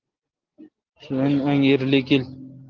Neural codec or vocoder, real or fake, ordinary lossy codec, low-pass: none; real; Opus, 16 kbps; 7.2 kHz